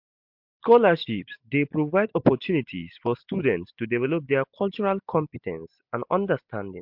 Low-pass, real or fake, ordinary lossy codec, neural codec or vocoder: 5.4 kHz; real; MP3, 48 kbps; none